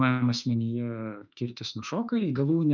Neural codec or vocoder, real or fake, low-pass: autoencoder, 48 kHz, 32 numbers a frame, DAC-VAE, trained on Japanese speech; fake; 7.2 kHz